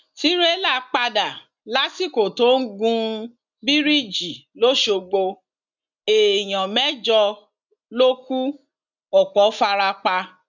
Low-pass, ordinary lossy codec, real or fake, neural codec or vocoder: 7.2 kHz; none; real; none